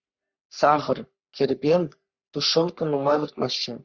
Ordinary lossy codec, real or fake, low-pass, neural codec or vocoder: Opus, 64 kbps; fake; 7.2 kHz; codec, 44.1 kHz, 3.4 kbps, Pupu-Codec